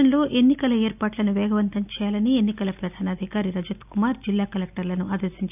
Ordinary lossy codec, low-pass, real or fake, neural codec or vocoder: none; 3.6 kHz; real; none